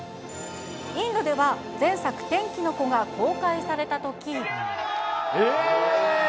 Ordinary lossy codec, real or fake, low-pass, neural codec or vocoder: none; real; none; none